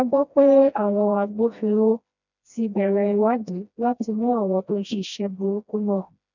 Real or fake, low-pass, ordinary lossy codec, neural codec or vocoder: fake; 7.2 kHz; none; codec, 16 kHz, 1 kbps, FreqCodec, smaller model